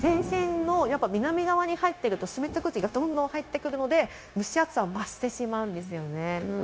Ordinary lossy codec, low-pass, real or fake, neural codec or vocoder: none; none; fake; codec, 16 kHz, 0.9 kbps, LongCat-Audio-Codec